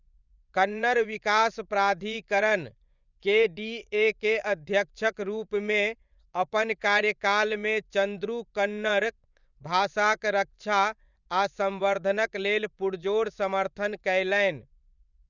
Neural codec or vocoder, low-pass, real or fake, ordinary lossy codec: vocoder, 24 kHz, 100 mel bands, Vocos; 7.2 kHz; fake; none